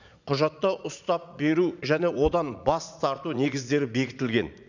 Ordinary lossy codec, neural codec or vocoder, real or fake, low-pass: none; vocoder, 44.1 kHz, 80 mel bands, Vocos; fake; 7.2 kHz